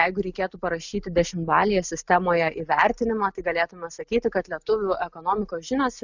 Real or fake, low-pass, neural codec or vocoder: real; 7.2 kHz; none